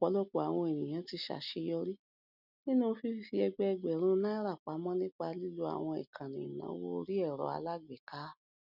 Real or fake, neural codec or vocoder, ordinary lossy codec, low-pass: real; none; none; 5.4 kHz